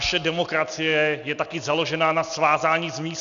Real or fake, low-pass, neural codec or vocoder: real; 7.2 kHz; none